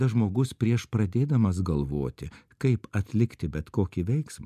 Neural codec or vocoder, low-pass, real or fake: none; 14.4 kHz; real